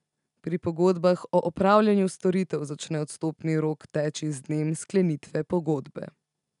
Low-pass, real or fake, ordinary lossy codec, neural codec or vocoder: 10.8 kHz; real; none; none